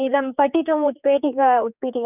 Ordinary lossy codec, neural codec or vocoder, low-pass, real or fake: none; codec, 16 kHz, 4 kbps, X-Codec, HuBERT features, trained on general audio; 3.6 kHz; fake